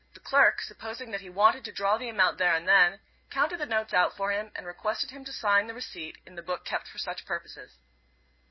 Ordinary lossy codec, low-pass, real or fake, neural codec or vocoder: MP3, 24 kbps; 7.2 kHz; real; none